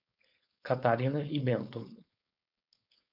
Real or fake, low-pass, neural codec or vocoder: fake; 5.4 kHz; codec, 16 kHz, 4.8 kbps, FACodec